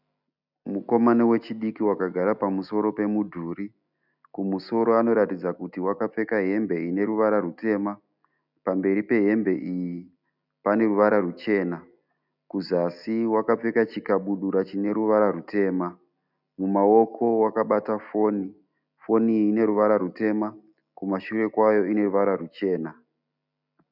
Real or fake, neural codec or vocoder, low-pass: real; none; 5.4 kHz